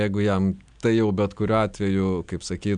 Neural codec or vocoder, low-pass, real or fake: none; 9.9 kHz; real